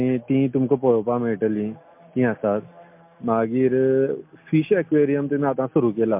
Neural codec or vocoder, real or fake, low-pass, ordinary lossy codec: none; real; 3.6 kHz; MP3, 32 kbps